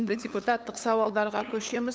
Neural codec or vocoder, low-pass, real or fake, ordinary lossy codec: codec, 16 kHz, 8 kbps, FunCodec, trained on LibriTTS, 25 frames a second; none; fake; none